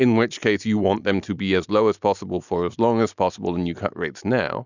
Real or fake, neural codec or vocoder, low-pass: real; none; 7.2 kHz